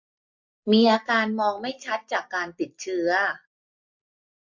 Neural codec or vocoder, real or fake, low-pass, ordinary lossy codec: none; real; 7.2 kHz; MP3, 48 kbps